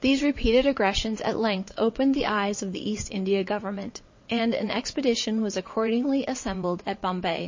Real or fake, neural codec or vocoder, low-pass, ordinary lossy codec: fake; vocoder, 22.05 kHz, 80 mel bands, WaveNeXt; 7.2 kHz; MP3, 32 kbps